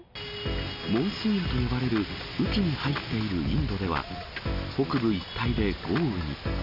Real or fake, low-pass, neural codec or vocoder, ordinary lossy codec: real; 5.4 kHz; none; none